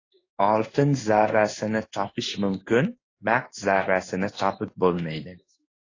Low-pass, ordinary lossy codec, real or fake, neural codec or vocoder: 7.2 kHz; AAC, 32 kbps; fake; codec, 16 kHz in and 24 kHz out, 1 kbps, XY-Tokenizer